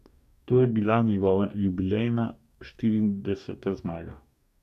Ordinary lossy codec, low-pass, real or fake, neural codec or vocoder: none; 14.4 kHz; fake; codec, 44.1 kHz, 2.6 kbps, DAC